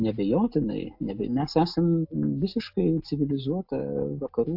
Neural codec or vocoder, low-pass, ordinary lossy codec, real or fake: none; 5.4 kHz; AAC, 48 kbps; real